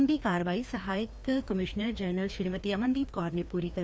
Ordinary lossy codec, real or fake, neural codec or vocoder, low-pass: none; fake; codec, 16 kHz, 2 kbps, FreqCodec, larger model; none